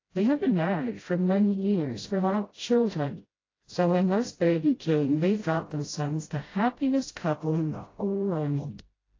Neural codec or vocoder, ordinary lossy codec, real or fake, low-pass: codec, 16 kHz, 0.5 kbps, FreqCodec, smaller model; AAC, 32 kbps; fake; 7.2 kHz